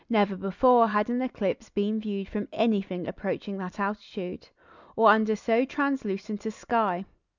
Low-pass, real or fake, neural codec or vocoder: 7.2 kHz; real; none